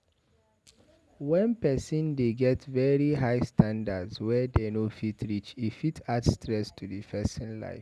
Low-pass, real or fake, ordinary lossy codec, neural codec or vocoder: none; real; none; none